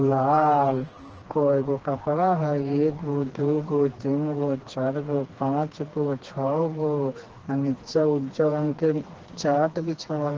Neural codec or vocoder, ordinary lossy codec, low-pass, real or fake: codec, 16 kHz, 2 kbps, FreqCodec, smaller model; Opus, 16 kbps; 7.2 kHz; fake